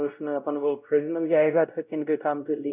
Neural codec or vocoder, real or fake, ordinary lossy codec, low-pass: codec, 16 kHz, 0.5 kbps, X-Codec, WavLM features, trained on Multilingual LibriSpeech; fake; none; 3.6 kHz